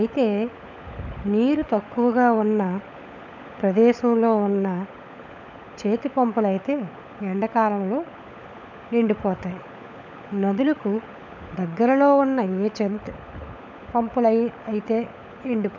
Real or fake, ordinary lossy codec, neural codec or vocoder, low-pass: fake; none; codec, 16 kHz, 16 kbps, FunCodec, trained on LibriTTS, 50 frames a second; 7.2 kHz